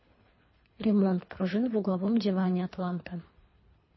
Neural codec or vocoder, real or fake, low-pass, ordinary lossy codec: codec, 24 kHz, 3 kbps, HILCodec; fake; 7.2 kHz; MP3, 24 kbps